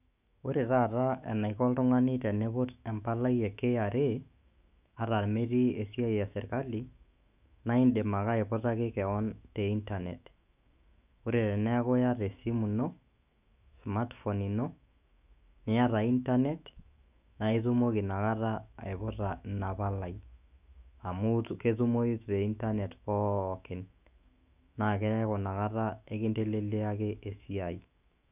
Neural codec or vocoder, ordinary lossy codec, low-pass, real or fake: autoencoder, 48 kHz, 128 numbers a frame, DAC-VAE, trained on Japanese speech; none; 3.6 kHz; fake